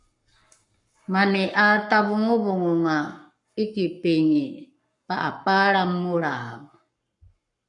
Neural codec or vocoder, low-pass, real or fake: codec, 44.1 kHz, 7.8 kbps, Pupu-Codec; 10.8 kHz; fake